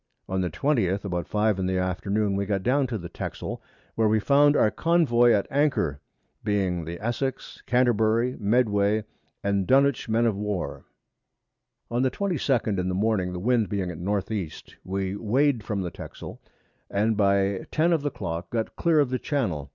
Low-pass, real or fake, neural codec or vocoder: 7.2 kHz; real; none